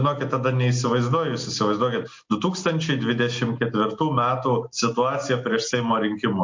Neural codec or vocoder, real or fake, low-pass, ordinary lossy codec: none; real; 7.2 kHz; MP3, 48 kbps